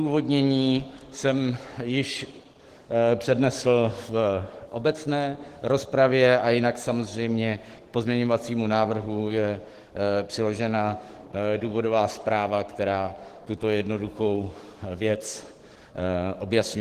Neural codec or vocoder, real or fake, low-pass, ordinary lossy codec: codec, 44.1 kHz, 7.8 kbps, DAC; fake; 14.4 kHz; Opus, 16 kbps